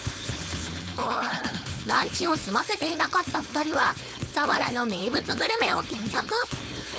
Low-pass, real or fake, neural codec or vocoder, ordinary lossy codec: none; fake; codec, 16 kHz, 4.8 kbps, FACodec; none